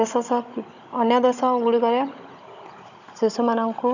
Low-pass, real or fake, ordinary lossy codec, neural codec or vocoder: 7.2 kHz; fake; none; codec, 16 kHz, 16 kbps, FunCodec, trained on Chinese and English, 50 frames a second